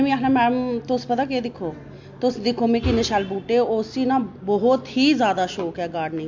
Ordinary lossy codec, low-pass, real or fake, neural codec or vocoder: MP3, 48 kbps; 7.2 kHz; real; none